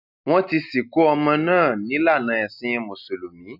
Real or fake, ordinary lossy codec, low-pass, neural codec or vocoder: real; none; 5.4 kHz; none